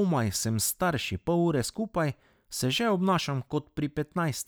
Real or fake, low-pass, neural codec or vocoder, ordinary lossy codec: real; none; none; none